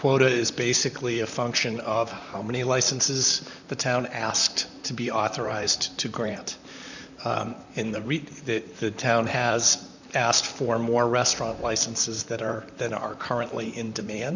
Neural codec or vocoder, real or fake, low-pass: vocoder, 44.1 kHz, 128 mel bands, Pupu-Vocoder; fake; 7.2 kHz